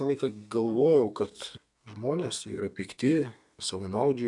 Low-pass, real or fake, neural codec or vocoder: 10.8 kHz; fake; codec, 32 kHz, 1.9 kbps, SNAC